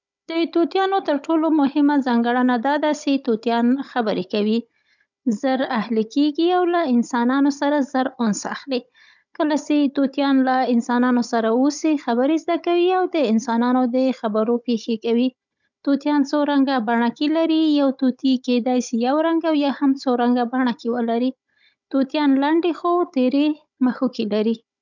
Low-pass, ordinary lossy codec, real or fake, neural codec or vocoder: 7.2 kHz; none; fake; codec, 16 kHz, 16 kbps, FunCodec, trained on Chinese and English, 50 frames a second